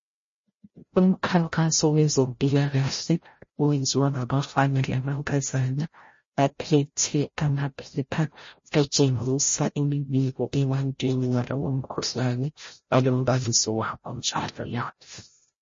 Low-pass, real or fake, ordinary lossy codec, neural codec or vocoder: 7.2 kHz; fake; MP3, 32 kbps; codec, 16 kHz, 0.5 kbps, FreqCodec, larger model